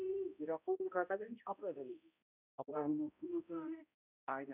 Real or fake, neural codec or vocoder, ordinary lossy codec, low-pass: fake; codec, 16 kHz, 0.5 kbps, X-Codec, HuBERT features, trained on balanced general audio; none; 3.6 kHz